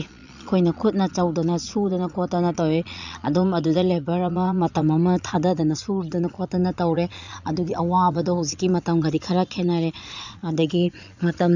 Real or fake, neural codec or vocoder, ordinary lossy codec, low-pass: fake; vocoder, 22.05 kHz, 80 mel bands, Vocos; none; 7.2 kHz